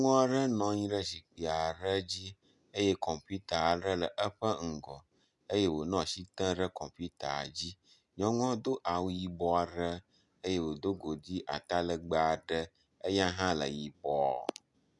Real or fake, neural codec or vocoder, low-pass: real; none; 10.8 kHz